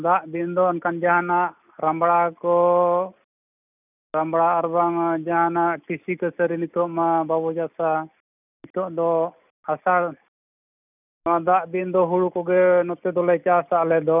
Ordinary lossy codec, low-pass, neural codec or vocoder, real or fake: none; 3.6 kHz; none; real